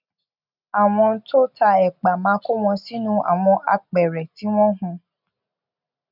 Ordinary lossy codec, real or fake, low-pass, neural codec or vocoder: none; real; 5.4 kHz; none